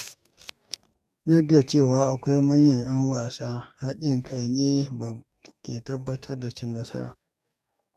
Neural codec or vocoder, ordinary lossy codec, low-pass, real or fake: codec, 44.1 kHz, 2.6 kbps, DAC; none; 14.4 kHz; fake